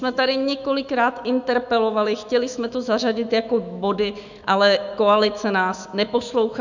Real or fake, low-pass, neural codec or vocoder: fake; 7.2 kHz; autoencoder, 48 kHz, 128 numbers a frame, DAC-VAE, trained on Japanese speech